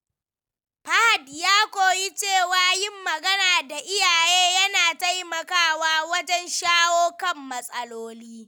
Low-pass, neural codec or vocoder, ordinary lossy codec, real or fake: none; none; none; real